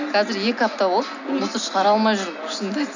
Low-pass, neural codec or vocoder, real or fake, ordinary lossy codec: 7.2 kHz; none; real; none